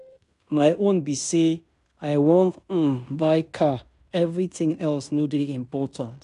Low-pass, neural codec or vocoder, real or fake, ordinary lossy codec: 10.8 kHz; codec, 16 kHz in and 24 kHz out, 0.9 kbps, LongCat-Audio-Codec, fine tuned four codebook decoder; fake; none